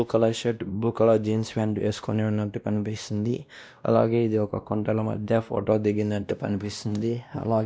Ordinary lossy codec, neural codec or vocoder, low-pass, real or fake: none; codec, 16 kHz, 1 kbps, X-Codec, WavLM features, trained on Multilingual LibriSpeech; none; fake